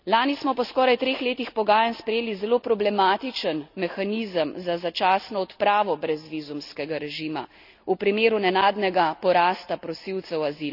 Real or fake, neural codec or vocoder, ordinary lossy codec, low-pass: real; none; none; 5.4 kHz